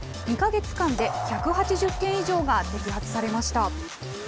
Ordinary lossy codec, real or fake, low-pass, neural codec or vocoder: none; real; none; none